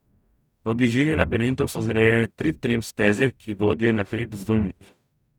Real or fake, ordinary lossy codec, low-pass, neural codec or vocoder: fake; none; 19.8 kHz; codec, 44.1 kHz, 0.9 kbps, DAC